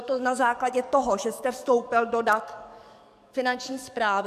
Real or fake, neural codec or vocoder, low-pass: fake; codec, 44.1 kHz, 7.8 kbps, DAC; 14.4 kHz